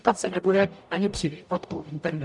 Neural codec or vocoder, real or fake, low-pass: codec, 44.1 kHz, 0.9 kbps, DAC; fake; 10.8 kHz